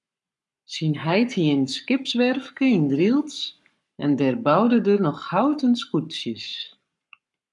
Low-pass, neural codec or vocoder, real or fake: 10.8 kHz; codec, 44.1 kHz, 7.8 kbps, Pupu-Codec; fake